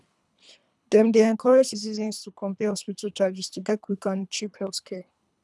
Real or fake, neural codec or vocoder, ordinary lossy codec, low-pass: fake; codec, 24 kHz, 3 kbps, HILCodec; none; none